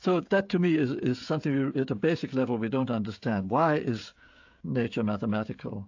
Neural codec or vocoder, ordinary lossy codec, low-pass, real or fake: codec, 16 kHz, 16 kbps, FreqCodec, smaller model; AAC, 48 kbps; 7.2 kHz; fake